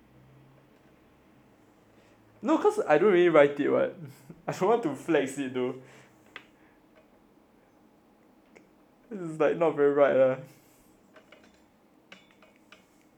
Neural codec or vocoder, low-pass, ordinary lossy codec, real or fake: none; 19.8 kHz; none; real